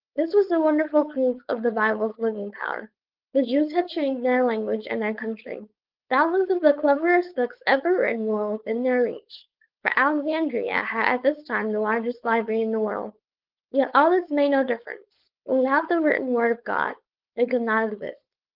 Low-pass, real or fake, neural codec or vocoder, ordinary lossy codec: 5.4 kHz; fake; codec, 16 kHz, 4.8 kbps, FACodec; Opus, 32 kbps